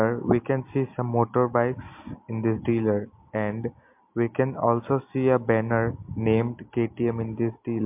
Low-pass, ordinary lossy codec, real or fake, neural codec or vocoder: 3.6 kHz; MP3, 32 kbps; real; none